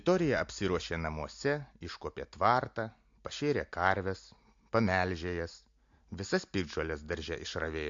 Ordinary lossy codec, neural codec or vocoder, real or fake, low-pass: MP3, 48 kbps; none; real; 7.2 kHz